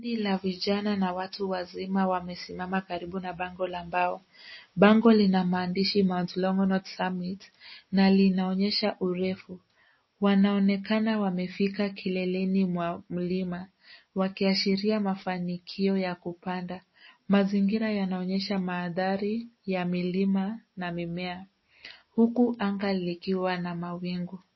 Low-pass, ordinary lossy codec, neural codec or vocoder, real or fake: 7.2 kHz; MP3, 24 kbps; none; real